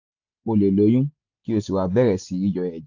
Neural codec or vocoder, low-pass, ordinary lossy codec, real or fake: none; 7.2 kHz; AAC, 48 kbps; real